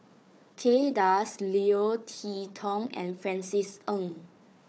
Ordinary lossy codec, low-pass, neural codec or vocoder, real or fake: none; none; codec, 16 kHz, 4 kbps, FunCodec, trained on Chinese and English, 50 frames a second; fake